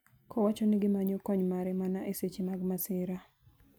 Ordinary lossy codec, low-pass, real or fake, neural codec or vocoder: none; none; real; none